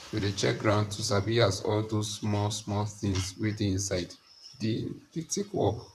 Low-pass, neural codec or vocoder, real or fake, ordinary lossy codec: 14.4 kHz; vocoder, 44.1 kHz, 128 mel bands, Pupu-Vocoder; fake; none